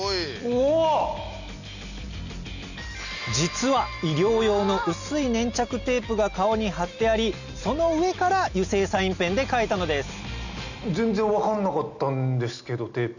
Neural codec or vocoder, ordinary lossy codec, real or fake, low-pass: none; none; real; 7.2 kHz